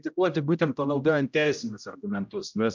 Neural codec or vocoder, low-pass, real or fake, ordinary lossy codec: codec, 16 kHz, 0.5 kbps, X-Codec, HuBERT features, trained on general audio; 7.2 kHz; fake; MP3, 64 kbps